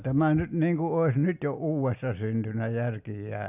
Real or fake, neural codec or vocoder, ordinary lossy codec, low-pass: real; none; none; 3.6 kHz